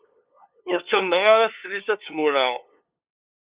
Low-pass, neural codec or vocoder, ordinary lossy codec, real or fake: 3.6 kHz; codec, 16 kHz, 2 kbps, FunCodec, trained on LibriTTS, 25 frames a second; Opus, 64 kbps; fake